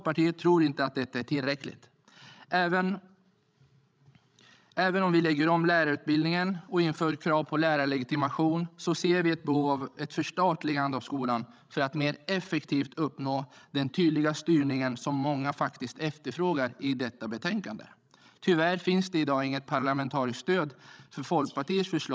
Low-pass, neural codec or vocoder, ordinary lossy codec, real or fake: none; codec, 16 kHz, 16 kbps, FreqCodec, larger model; none; fake